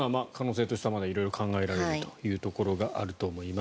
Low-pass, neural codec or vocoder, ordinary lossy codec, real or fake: none; none; none; real